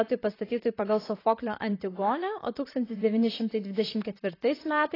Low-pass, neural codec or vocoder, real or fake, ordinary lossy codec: 5.4 kHz; vocoder, 44.1 kHz, 128 mel bands, Pupu-Vocoder; fake; AAC, 24 kbps